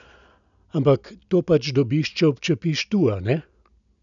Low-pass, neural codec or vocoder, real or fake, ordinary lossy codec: 7.2 kHz; none; real; none